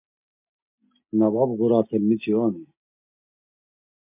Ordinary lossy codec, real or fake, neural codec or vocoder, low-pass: MP3, 32 kbps; real; none; 3.6 kHz